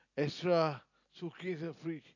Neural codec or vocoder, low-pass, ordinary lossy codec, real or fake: none; 7.2 kHz; none; real